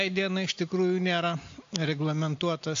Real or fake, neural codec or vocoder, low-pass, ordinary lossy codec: real; none; 7.2 kHz; AAC, 48 kbps